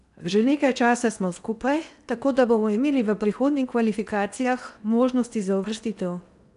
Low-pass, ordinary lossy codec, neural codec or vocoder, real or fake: 10.8 kHz; none; codec, 16 kHz in and 24 kHz out, 0.8 kbps, FocalCodec, streaming, 65536 codes; fake